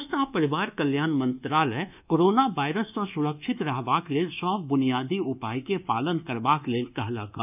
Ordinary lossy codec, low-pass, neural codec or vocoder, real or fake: none; 3.6 kHz; codec, 24 kHz, 1.2 kbps, DualCodec; fake